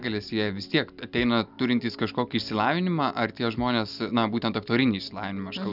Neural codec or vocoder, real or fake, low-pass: none; real; 5.4 kHz